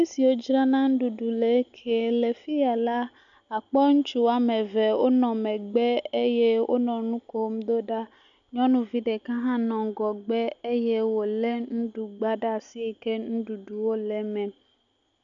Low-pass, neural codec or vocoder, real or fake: 7.2 kHz; none; real